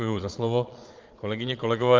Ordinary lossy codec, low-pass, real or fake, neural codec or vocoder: Opus, 24 kbps; 7.2 kHz; fake; vocoder, 22.05 kHz, 80 mel bands, Vocos